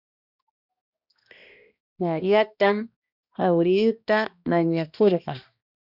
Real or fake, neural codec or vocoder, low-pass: fake; codec, 16 kHz, 1 kbps, X-Codec, HuBERT features, trained on balanced general audio; 5.4 kHz